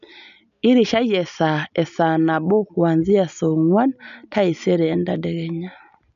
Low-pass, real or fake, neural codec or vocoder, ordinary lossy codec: 7.2 kHz; real; none; none